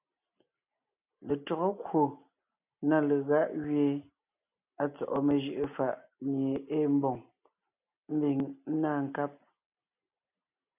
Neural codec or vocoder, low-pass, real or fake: none; 3.6 kHz; real